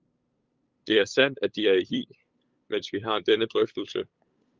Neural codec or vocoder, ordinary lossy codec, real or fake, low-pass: codec, 16 kHz, 8 kbps, FunCodec, trained on LibriTTS, 25 frames a second; Opus, 24 kbps; fake; 7.2 kHz